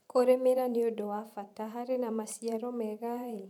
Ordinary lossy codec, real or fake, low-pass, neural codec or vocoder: none; fake; 19.8 kHz; vocoder, 44.1 kHz, 128 mel bands every 512 samples, BigVGAN v2